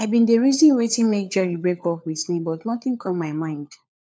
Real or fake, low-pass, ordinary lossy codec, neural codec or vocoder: fake; none; none; codec, 16 kHz, 4 kbps, FunCodec, trained on LibriTTS, 50 frames a second